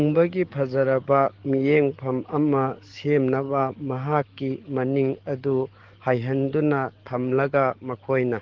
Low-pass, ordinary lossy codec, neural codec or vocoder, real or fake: 7.2 kHz; Opus, 16 kbps; codec, 44.1 kHz, 7.8 kbps, DAC; fake